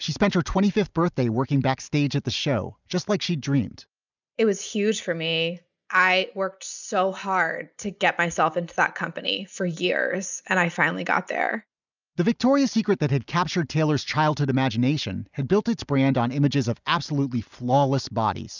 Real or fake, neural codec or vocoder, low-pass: real; none; 7.2 kHz